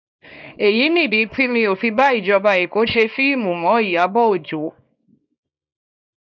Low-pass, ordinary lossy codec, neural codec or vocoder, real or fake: 7.2 kHz; none; codec, 24 kHz, 0.9 kbps, WavTokenizer, small release; fake